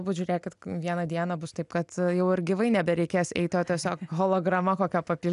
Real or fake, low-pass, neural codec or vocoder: real; 10.8 kHz; none